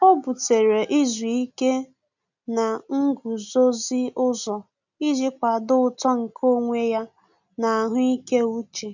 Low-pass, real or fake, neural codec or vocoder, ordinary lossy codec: 7.2 kHz; real; none; none